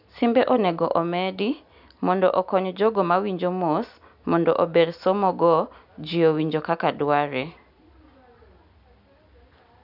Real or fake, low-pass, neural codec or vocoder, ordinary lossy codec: real; 5.4 kHz; none; none